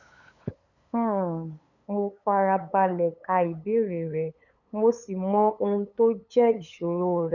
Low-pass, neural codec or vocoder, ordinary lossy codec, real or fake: 7.2 kHz; codec, 16 kHz, 8 kbps, FunCodec, trained on LibriTTS, 25 frames a second; none; fake